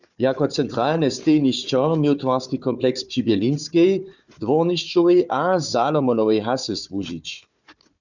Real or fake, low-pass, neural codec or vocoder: fake; 7.2 kHz; codec, 16 kHz, 4 kbps, FunCodec, trained on Chinese and English, 50 frames a second